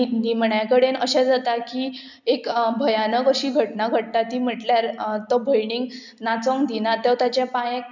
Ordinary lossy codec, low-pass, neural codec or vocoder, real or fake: none; 7.2 kHz; none; real